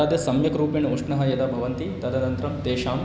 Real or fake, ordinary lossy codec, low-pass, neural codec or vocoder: real; none; none; none